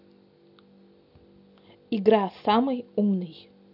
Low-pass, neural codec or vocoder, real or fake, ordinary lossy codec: 5.4 kHz; none; real; none